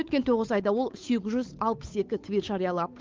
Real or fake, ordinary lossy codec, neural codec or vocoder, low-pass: fake; Opus, 32 kbps; codec, 16 kHz, 8 kbps, FunCodec, trained on Chinese and English, 25 frames a second; 7.2 kHz